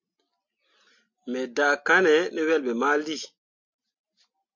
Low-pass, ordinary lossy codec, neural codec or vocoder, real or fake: 7.2 kHz; MP3, 48 kbps; none; real